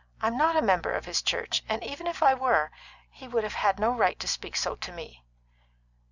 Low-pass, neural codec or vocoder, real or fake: 7.2 kHz; none; real